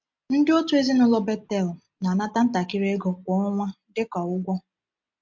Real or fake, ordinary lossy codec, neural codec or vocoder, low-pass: real; MP3, 48 kbps; none; 7.2 kHz